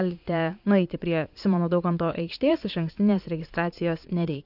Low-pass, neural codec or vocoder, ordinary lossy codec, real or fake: 5.4 kHz; vocoder, 44.1 kHz, 80 mel bands, Vocos; AAC, 48 kbps; fake